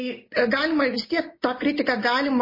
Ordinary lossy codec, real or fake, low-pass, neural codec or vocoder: MP3, 24 kbps; real; 5.4 kHz; none